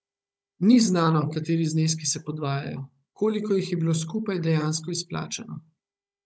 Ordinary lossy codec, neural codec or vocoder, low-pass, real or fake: none; codec, 16 kHz, 16 kbps, FunCodec, trained on Chinese and English, 50 frames a second; none; fake